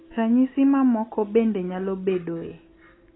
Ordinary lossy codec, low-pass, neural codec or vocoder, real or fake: AAC, 16 kbps; 7.2 kHz; none; real